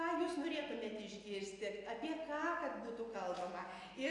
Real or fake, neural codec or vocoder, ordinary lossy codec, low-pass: real; none; AAC, 48 kbps; 10.8 kHz